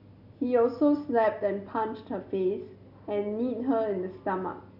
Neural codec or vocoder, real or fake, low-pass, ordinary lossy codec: none; real; 5.4 kHz; none